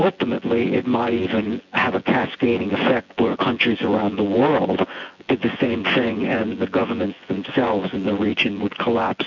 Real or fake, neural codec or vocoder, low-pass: fake; vocoder, 24 kHz, 100 mel bands, Vocos; 7.2 kHz